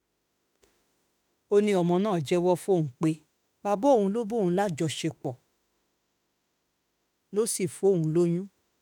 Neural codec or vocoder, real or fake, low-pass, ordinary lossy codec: autoencoder, 48 kHz, 32 numbers a frame, DAC-VAE, trained on Japanese speech; fake; none; none